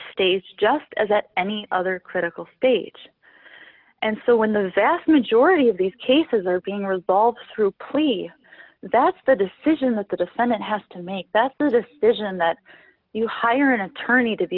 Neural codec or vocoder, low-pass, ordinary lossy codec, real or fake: none; 5.4 kHz; Opus, 32 kbps; real